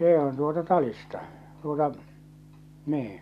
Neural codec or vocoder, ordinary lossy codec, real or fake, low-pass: none; none; real; 14.4 kHz